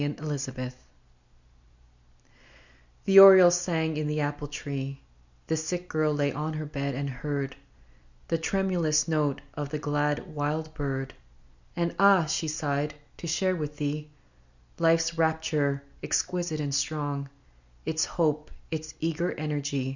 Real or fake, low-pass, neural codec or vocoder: real; 7.2 kHz; none